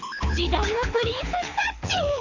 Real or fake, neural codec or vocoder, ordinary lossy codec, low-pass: fake; codec, 24 kHz, 6 kbps, HILCodec; AAC, 48 kbps; 7.2 kHz